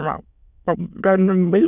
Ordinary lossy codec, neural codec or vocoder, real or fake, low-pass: none; autoencoder, 22.05 kHz, a latent of 192 numbers a frame, VITS, trained on many speakers; fake; 3.6 kHz